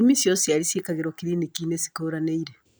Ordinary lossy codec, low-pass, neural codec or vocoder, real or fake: none; none; none; real